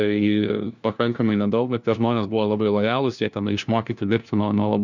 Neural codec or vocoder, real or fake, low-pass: codec, 16 kHz, 1 kbps, FunCodec, trained on LibriTTS, 50 frames a second; fake; 7.2 kHz